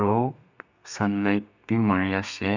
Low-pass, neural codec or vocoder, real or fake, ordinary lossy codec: 7.2 kHz; codec, 32 kHz, 1.9 kbps, SNAC; fake; none